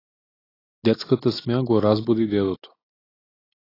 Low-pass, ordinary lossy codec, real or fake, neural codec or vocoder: 5.4 kHz; AAC, 24 kbps; real; none